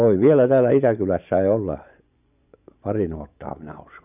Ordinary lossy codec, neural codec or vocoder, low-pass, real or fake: none; vocoder, 24 kHz, 100 mel bands, Vocos; 3.6 kHz; fake